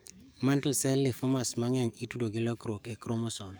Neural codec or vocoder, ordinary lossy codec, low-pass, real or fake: codec, 44.1 kHz, 7.8 kbps, DAC; none; none; fake